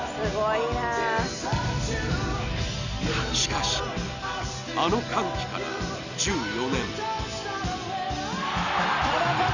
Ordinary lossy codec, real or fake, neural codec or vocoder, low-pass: none; real; none; 7.2 kHz